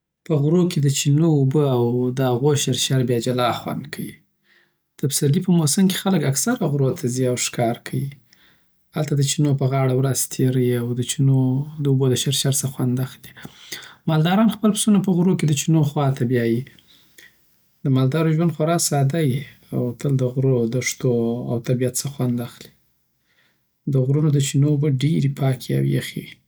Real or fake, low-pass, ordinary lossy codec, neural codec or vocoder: real; none; none; none